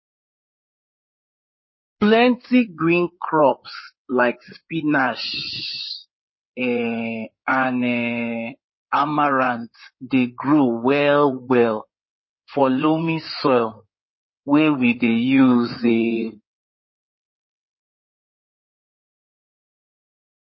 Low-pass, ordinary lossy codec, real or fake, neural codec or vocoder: 7.2 kHz; MP3, 24 kbps; fake; codec, 16 kHz in and 24 kHz out, 2.2 kbps, FireRedTTS-2 codec